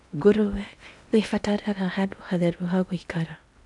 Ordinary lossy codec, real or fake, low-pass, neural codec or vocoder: none; fake; 10.8 kHz; codec, 16 kHz in and 24 kHz out, 0.8 kbps, FocalCodec, streaming, 65536 codes